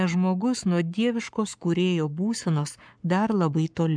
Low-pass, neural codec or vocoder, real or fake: 9.9 kHz; codec, 44.1 kHz, 7.8 kbps, Pupu-Codec; fake